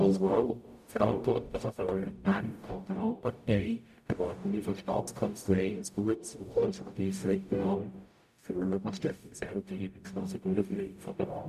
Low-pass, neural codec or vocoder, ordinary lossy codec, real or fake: 14.4 kHz; codec, 44.1 kHz, 0.9 kbps, DAC; none; fake